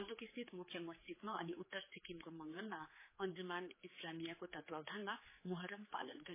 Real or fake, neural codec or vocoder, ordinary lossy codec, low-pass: fake; codec, 16 kHz, 4 kbps, X-Codec, HuBERT features, trained on general audio; MP3, 16 kbps; 3.6 kHz